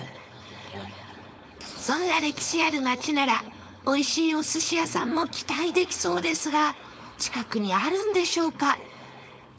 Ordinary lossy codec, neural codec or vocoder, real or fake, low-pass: none; codec, 16 kHz, 4.8 kbps, FACodec; fake; none